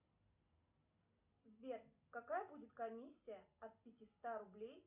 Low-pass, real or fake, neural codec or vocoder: 3.6 kHz; real; none